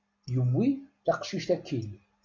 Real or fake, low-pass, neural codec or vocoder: real; 7.2 kHz; none